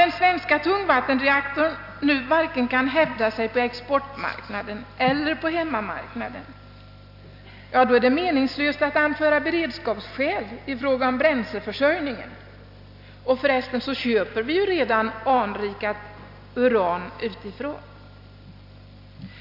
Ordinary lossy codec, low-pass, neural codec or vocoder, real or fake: AAC, 48 kbps; 5.4 kHz; none; real